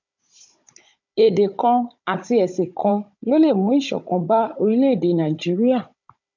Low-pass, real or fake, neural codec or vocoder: 7.2 kHz; fake; codec, 16 kHz, 16 kbps, FunCodec, trained on Chinese and English, 50 frames a second